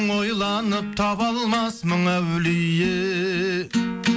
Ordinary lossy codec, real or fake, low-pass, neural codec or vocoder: none; real; none; none